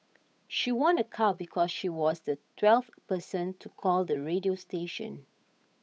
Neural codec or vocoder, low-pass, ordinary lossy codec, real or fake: codec, 16 kHz, 8 kbps, FunCodec, trained on Chinese and English, 25 frames a second; none; none; fake